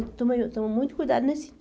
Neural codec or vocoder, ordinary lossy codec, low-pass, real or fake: none; none; none; real